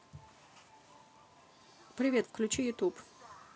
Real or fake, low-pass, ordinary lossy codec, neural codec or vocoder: real; none; none; none